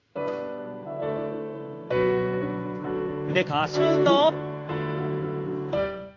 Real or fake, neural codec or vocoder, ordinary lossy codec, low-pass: fake; codec, 16 kHz in and 24 kHz out, 1 kbps, XY-Tokenizer; AAC, 48 kbps; 7.2 kHz